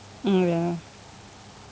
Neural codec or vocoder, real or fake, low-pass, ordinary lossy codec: none; real; none; none